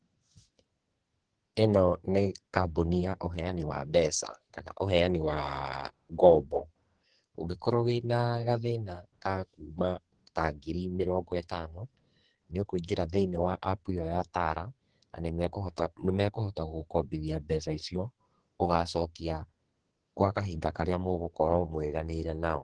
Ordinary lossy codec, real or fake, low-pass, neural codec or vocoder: Opus, 16 kbps; fake; 9.9 kHz; codec, 44.1 kHz, 2.6 kbps, SNAC